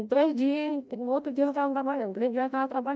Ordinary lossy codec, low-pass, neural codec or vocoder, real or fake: none; none; codec, 16 kHz, 0.5 kbps, FreqCodec, larger model; fake